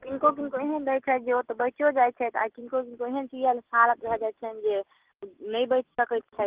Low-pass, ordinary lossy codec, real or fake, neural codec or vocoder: 3.6 kHz; Opus, 16 kbps; real; none